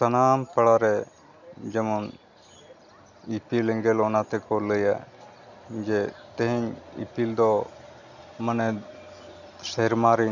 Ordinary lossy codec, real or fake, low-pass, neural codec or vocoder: none; real; 7.2 kHz; none